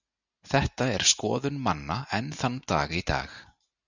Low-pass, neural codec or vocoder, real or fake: 7.2 kHz; none; real